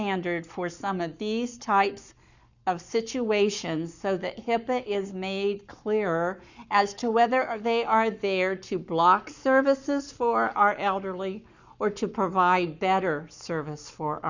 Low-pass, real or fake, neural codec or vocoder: 7.2 kHz; fake; codec, 44.1 kHz, 7.8 kbps, Pupu-Codec